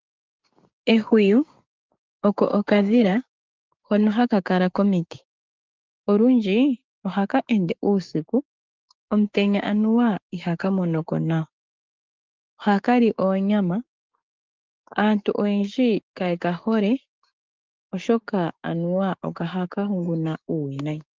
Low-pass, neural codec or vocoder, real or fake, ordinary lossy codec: 7.2 kHz; codec, 16 kHz, 6 kbps, DAC; fake; Opus, 32 kbps